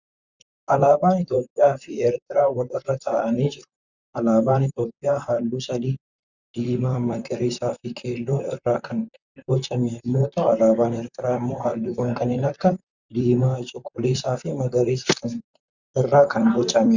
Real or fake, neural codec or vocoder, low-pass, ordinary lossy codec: fake; vocoder, 44.1 kHz, 128 mel bands, Pupu-Vocoder; 7.2 kHz; Opus, 64 kbps